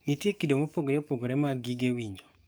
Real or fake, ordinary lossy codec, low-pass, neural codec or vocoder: fake; none; none; codec, 44.1 kHz, 7.8 kbps, DAC